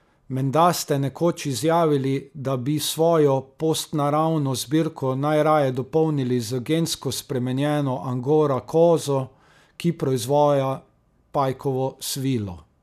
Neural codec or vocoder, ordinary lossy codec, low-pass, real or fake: none; none; 14.4 kHz; real